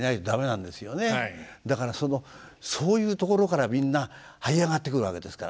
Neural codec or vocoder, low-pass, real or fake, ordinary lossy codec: none; none; real; none